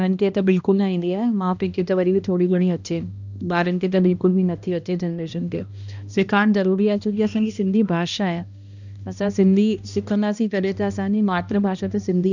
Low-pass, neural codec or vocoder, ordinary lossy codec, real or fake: 7.2 kHz; codec, 16 kHz, 1 kbps, X-Codec, HuBERT features, trained on balanced general audio; none; fake